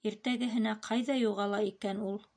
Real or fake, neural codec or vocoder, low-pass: real; none; 9.9 kHz